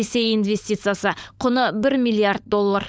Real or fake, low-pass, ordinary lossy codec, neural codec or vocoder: fake; none; none; codec, 16 kHz, 16 kbps, FunCodec, trained on LibriTTS, 50 frames a second